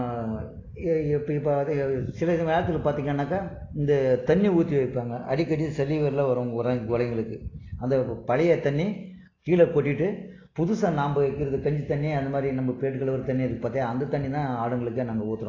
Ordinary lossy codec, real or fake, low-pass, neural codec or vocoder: AAC, 32 kbps; real; 7.2 kHz; none